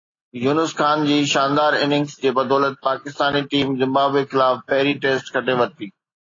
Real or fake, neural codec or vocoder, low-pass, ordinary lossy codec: real; none; 7.2 kHz; AAC, 32 kbps